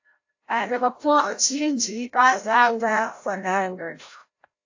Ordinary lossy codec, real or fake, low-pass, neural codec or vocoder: AAC, 48 kbps; fake; 7.2 kHz; codec, 16 kHz, 0.5 kbps, FreqCodec, larger model